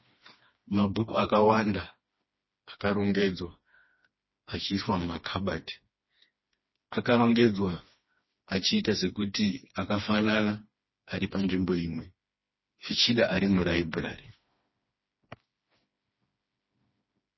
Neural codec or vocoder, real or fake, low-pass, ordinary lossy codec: codec, 16 kHz, 2 kbps, FreqCodec, smaller model; fake; 7.2 kHz; MP3, 24 kbps